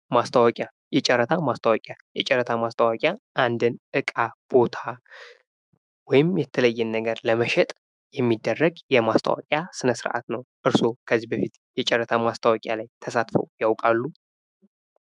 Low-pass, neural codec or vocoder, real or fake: 10.8 kHz; autoencoder, 48 kHz, 128 numbers a frame, DAC-VAE, trained on Japanese speech; fake